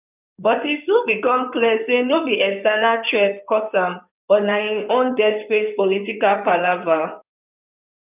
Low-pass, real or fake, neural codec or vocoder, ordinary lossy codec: 3.6 kHz; fake; codec, 16 kHz in and 24 kHz out, 2.2 kbps, FireRedTTS-2 codec; none